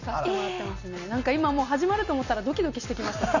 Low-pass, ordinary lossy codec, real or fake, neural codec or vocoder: 7.2 kHz; none; real; none